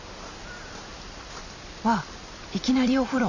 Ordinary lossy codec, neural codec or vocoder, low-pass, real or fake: none; vocoder, 44.1 kHz, 128 mel bands every 256 samples, BigVGAN v2; 7.2 kHz; fake